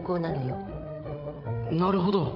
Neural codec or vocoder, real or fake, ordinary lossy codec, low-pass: codec, 16 kHz, 8 kbps, FreqCodec, larger model; fake; none; 5.4 kHz